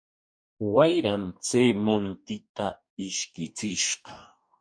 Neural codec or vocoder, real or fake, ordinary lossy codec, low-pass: codec, 44.1 kHz, 2.6 kbps, DAC; fake; MP3, 96 kbps; 9.9 kHz